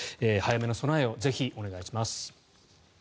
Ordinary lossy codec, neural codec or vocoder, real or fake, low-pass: none; none; real; none